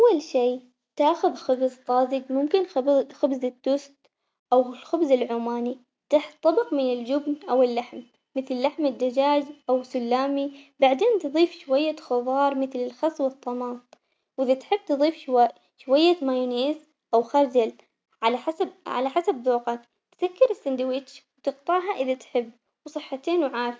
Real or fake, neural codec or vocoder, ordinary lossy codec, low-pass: real; none; none; none